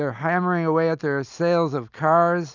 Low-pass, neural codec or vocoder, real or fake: 7.2 kHz; none; real